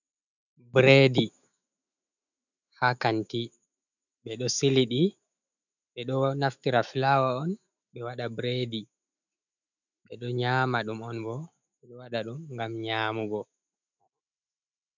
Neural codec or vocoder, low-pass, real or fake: autoencoder, 48 kHz, 128 numbers a frame, DAC-VAE, trained on Japanese speech; 7.2 kHz; fake